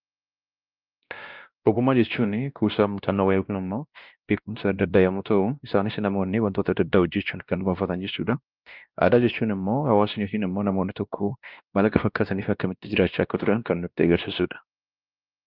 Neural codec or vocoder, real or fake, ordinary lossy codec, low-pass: codec, 16 kHz, 1 kbps, X-Codec, WavLM features, trained on Multilingual LibriSpeech; fake; Opus, 32 kbps; 5.4 kHz